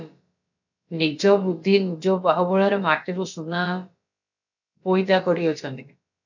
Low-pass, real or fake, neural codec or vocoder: 7.2 kHz; fake; codec, 16 kHz, about 1 kbps, DyCAST, with the encoder's durations